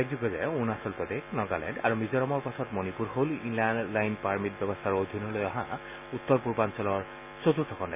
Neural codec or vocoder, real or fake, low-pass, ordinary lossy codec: none; real; 3.6 kHz; none